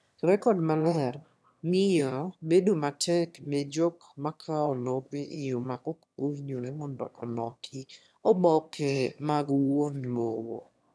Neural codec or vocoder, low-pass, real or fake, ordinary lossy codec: autoencoder, 22.05 kHz, a latent of 192 numbers a frame, VITS, trained on one speaker; none; fake; none